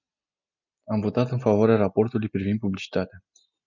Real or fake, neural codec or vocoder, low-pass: real; none; 7.2 kHz